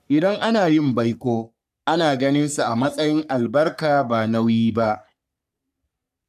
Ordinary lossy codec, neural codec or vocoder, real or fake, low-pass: none; codec, 44.1 kHz, 3.4 kbps, Pupu-Codec; fake; 14.4 kHz